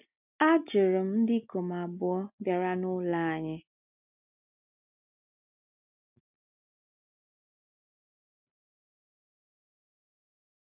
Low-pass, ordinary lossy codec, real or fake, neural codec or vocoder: 3.6 kHz; none; real; none